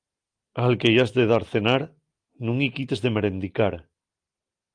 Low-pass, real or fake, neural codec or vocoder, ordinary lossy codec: 9.9 kHz; real; none; Opus, 24 kbps